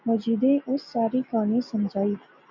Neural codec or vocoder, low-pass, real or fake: none; 7.2 kHz; real